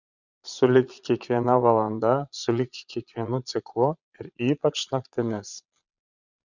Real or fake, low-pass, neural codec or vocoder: fake; 7.2 kHz; vocoder, 22.05 kHz, 80 mel bands, Vocos